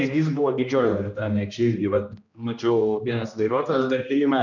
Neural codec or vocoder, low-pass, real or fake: codec, 16 kHz, 1 kbps, X-Codec, HuBERT features, trained on general audio; 7.2 kHz; fake